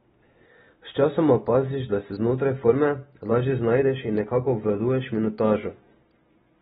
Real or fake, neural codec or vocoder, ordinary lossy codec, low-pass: real; none; AAC, 16 kbps; 19.8 kHz